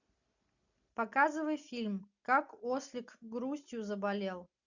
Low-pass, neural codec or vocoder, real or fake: 7.2 kHz; none; real